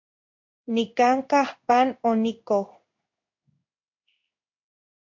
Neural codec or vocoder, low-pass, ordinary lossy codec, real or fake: codec, 16 kHz in and 24 kHz out, 1 kbps, XY-Tokenizer; 7.2 kHz; MP3, 48 kbps; fake